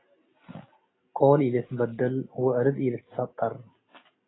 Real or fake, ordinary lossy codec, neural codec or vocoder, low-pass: real; AAC, 16 kbps; none; 7.2 kHz